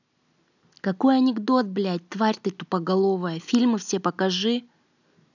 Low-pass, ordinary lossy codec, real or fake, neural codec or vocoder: 7.2 kHz; none; real; none